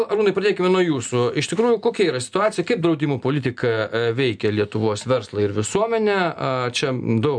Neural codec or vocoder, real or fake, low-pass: none; real; 9.9 kHz